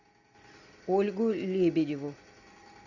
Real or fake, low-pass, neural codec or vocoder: real; 7.2 kHz; none